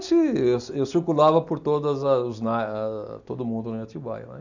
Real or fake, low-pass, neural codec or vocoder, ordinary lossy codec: real; 7.2 kHz; none; none